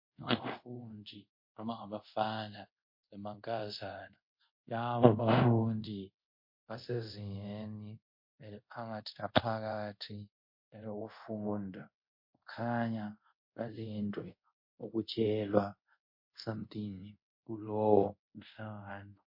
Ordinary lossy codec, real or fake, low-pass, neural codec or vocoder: MP3, 32 kbps; fake; 5.4 kHz; codec, 24 kHz, 0.5 kbps, DualCodec